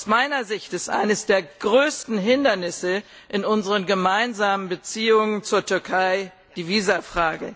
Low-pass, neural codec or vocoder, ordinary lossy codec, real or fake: none; none; none; real